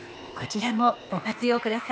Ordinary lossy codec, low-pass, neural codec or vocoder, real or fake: none; none; codec, 16 kHz, 0.8 kbps, ZipCodec; fake